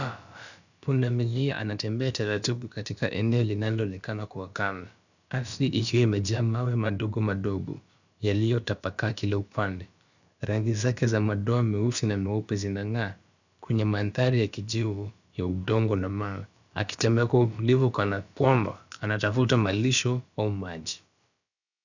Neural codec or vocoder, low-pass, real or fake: codec, 16 kHz, about 1 kbps, DyCAST, with the encoder's durations; 7.2 kHz; fake